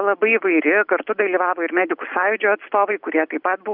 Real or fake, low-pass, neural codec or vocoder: real; 5.4 kHz; none